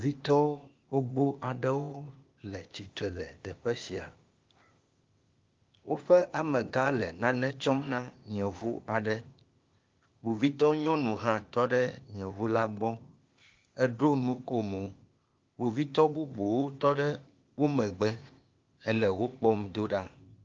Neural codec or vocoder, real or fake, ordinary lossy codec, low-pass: codec, 16 kHz, 0.8 kbps, ZipCodec; fake; Opus, 32 kbps; 7.2 kHz